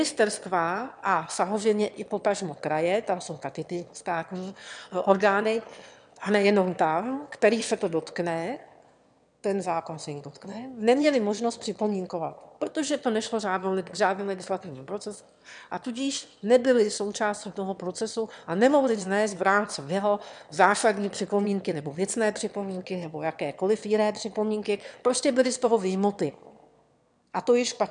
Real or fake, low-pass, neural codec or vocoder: fake; 9.9 kHz; autoencoder, 22.05 kHz, a latent of 192 numbers a frame, VITS, trained on one speaker